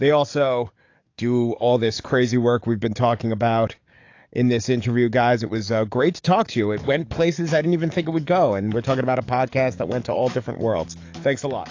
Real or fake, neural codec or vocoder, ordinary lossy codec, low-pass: fake; codec, 44.1 kHz, 7.8 kbps, DAC; AAC, 48 kbps; 7.2 kHz